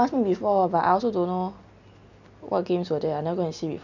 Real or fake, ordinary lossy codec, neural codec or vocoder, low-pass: real; none; none; 7.2 kHz